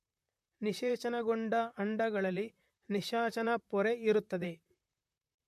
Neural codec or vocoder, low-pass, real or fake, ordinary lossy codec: vocoder, 44.1 kHz, 128 mel bands, Pupu-Vocoder; 14.4 kHz; fake; MP3, 64 kbps